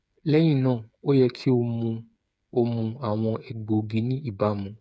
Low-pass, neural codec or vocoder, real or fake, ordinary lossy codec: none; codec, 16 kHz, 8 kbps, FreqCodec, smaller model; fake; none